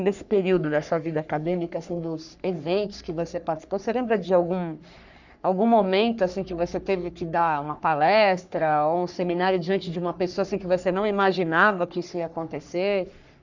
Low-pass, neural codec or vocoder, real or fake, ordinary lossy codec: 7.2 kHz; codec, 44.1 kHz, 3.4 kbps, Pupu-Codec; fake; none